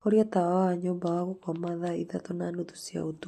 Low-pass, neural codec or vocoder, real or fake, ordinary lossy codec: 14.4 kHz; none; real; AAC, 64 kbps